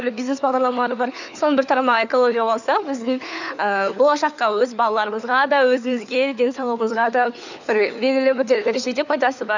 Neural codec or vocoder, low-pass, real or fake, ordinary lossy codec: codec, 16 kHz, 4 kbps, FunCodec, trained on LibriTTS, 50 frames a second; 7.2 kHz; fake; none